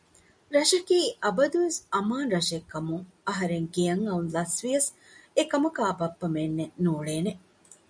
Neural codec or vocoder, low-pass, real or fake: none; 9.9 kHz; real